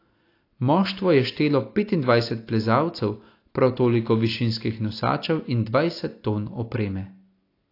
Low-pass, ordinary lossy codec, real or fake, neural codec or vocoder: 5.4 kHz; AAC, 32 kbps; real; none